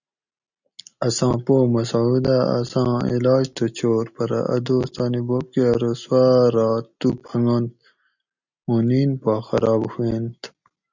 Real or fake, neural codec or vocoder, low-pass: real; none; 7.2 kHz